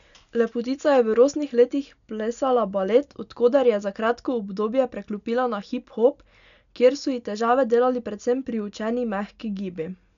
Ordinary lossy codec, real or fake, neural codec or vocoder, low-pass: none; real; none; 7.2 kHz